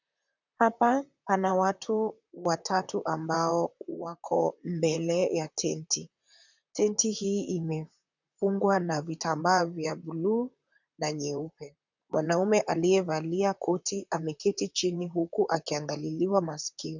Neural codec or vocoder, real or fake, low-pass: vocoder, 44.1 kHz, 128 mel bands, Pupu-Vocoder; fake; 7.2 kHz